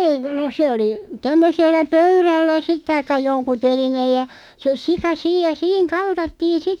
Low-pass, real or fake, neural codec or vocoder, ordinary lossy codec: 19.8 kHz; fake; autoencoder, 48 kHz, 32 numbers a frame, DAC-VAE, trained on Japanese speech; none